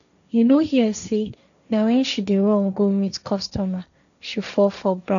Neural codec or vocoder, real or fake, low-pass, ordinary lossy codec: codec, 16 kHz, 1.1 kbps, Voila-Tokenizer; fake; 7.2 kHz; none